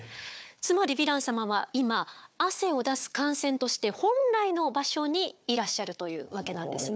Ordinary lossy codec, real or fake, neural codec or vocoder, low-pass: none; fake; codec, 16 kHz, 4 kbps, FunCodec, trained on Chinese and English, 50 frames a second; none